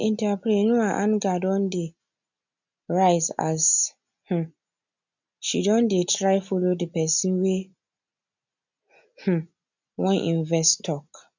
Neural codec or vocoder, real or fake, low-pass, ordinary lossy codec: none; real; 7.2 kHz; none